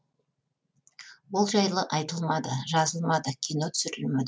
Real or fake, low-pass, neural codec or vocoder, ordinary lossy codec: real; none; none; none